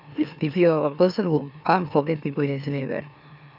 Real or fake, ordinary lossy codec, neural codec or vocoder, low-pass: fake; none; autoencoder, 44.1 kHz, a latent of 192 numbers a frame, MeloTTS; 5.4 kHz